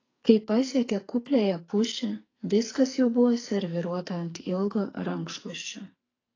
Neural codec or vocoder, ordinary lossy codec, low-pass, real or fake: codec, 44.1 kHz, 2.6 kbps, SNAC; AAC, 32 kbps; 7.2 kHz; fake